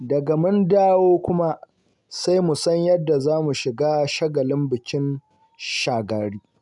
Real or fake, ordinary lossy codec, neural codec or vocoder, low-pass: real; none; none; 10.8 kHz